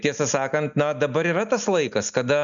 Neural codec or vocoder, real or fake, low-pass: none; real; 7.2 kHz